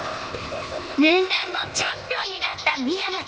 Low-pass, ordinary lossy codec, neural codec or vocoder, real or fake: none; none; codec, 16 kHz, 0.8 kbps, ZipCodec; fake